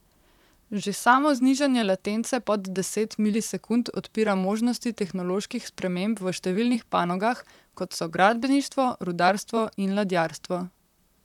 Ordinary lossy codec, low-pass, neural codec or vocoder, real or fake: none; 19.8 kHz; vocoder, 44.1 kHz, 128 mel bands, Pupu-Vocoder; fake